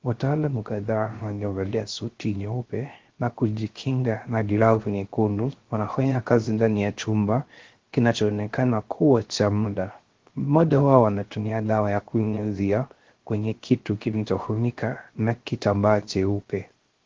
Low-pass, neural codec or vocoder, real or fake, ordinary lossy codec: 7.2 kHz; codec, 16 kHz, 0.3 kbps, FocalCodec; fake; Opus, 16 kbps